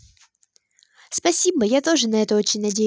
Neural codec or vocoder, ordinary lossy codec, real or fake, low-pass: none; none; real; none